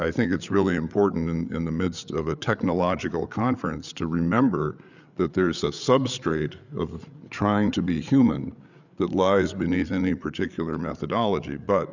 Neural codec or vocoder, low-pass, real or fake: codec, 16 kHz, 8 kbps, FreqCodec, larger model; 7.2 kHz; fake